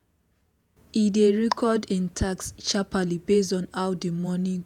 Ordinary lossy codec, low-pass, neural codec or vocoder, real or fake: none; 19.8 kHz; vocoder, 48 kHz, 128 mel bands, Vocos; fake